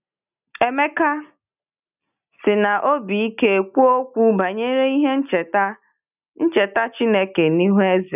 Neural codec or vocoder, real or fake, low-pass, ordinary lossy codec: none; real; 3.6 kHz; none